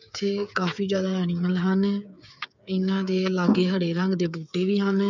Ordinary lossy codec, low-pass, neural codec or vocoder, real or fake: none; 7.2 kHz; codec, 16 kHz, 8 kbps, FreqCodec, smaller model; fake